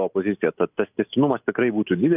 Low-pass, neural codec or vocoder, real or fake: 3.6 kHz; none; real